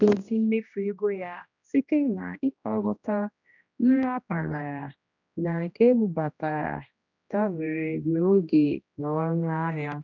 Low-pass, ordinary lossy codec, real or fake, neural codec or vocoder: 7.2 kHz; none; fake; codec, 16 kHz, 1 kbps, X-Codec, HuBERT features, trained on general audio